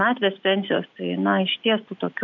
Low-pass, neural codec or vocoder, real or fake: 7.2 kHz; none; real